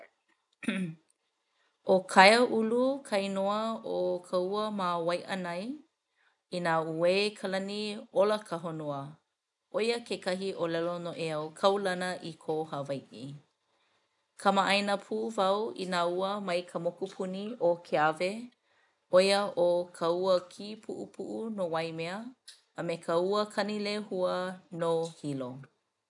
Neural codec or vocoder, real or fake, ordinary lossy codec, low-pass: none; real; none; 10.8 kHz